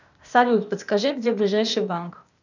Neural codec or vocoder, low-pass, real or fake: codec, 16 kHz, 0.8 kbps, ZipCodec; 7.2 kHz; fake